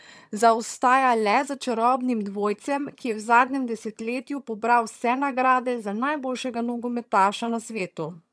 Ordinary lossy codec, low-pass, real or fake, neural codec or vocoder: none; none; fake; vocoder, 22.05 kHz, 80 mel bands, HiFi-GAN